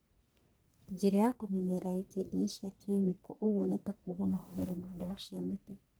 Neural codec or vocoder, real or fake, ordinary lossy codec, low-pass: codec, 44.1 kHz, 1.7 kbps, Pupu-Codec; fake; none; none